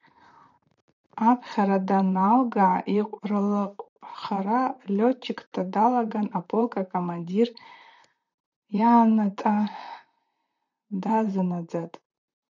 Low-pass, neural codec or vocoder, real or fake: 7.2 kHz; vocoder, 44.1 kHz, 128 mel bands, Pupu-Vocoder; fake